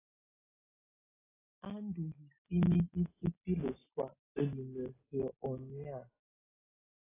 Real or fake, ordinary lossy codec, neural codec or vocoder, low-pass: real; AAC, 16 kbps; none; 3.6 kHz